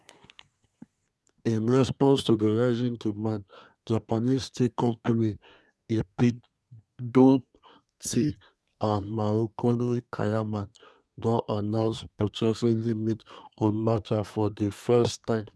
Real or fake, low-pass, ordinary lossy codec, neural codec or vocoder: fake; none; none; codec, 24 kHz, 1 kbps, SNAC